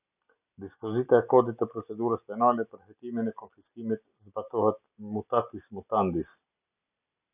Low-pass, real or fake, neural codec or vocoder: 3.6 kHz; real; none